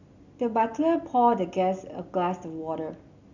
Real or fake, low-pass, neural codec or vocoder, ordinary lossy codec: real; 7.2 kHz; none; none